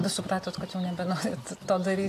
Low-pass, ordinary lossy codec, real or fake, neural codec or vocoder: 14.4 kHz; AAC, 64 kbps; real; none